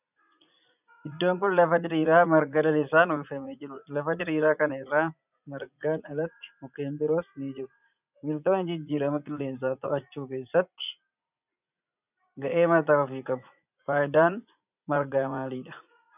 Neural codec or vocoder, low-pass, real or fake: vocoder, 44.1 kHz, 80 mel bands, Vocos; 3.6 kHz; fake